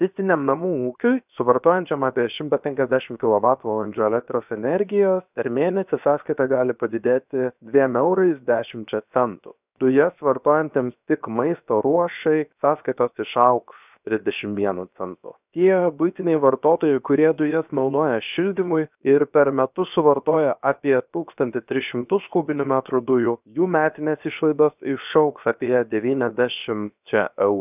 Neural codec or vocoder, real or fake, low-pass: codec, 16 kHz, 0.7 kbps, FocalCodec; fake; 3.6 kHz